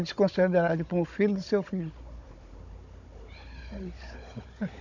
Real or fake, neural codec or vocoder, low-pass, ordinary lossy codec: fake; codec, 16 kHz, 16 kbps, FunCodec, trained on Chinese and English, 50 frames a second; 7.2 kHz; none